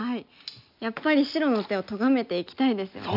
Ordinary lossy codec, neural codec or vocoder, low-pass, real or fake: none; none; 5.4 kHz; real